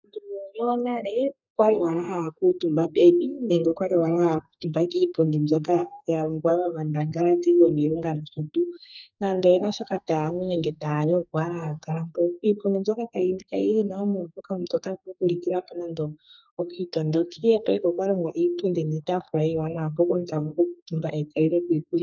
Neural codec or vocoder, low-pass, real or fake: codec, 32 kHz, 1.9 kbps, SNAC; 7.2 kHz; fake